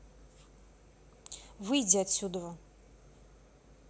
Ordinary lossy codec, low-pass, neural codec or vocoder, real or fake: none; none; none; real